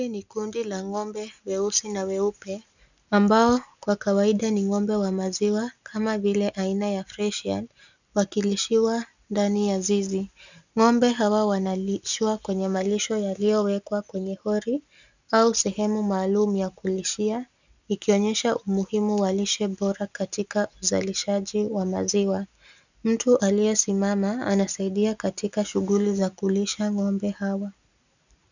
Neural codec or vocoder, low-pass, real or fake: none; 7.2 kHz; real